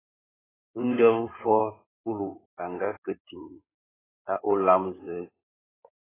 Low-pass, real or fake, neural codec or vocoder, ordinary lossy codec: 3.6 kHz; fake; codec, 16 kHz in and 24 kHz out, 2.2 kbps, FireRedTTS-2 codec; AAC, 16 kbps